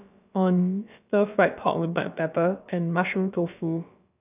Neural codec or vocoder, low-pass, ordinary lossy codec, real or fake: codec, 16 kHz, about 1 kbps, DyCAST, with the encoder's durations; 3.6 kHz; none; fake